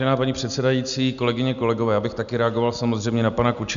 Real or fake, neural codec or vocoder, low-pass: real; none; 7.2 kHz